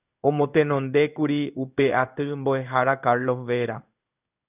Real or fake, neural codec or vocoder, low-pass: fake; codec, 16 kHz in and 24 kHz out, 1 kbps, XY-Tokenizer; 3.6 kHz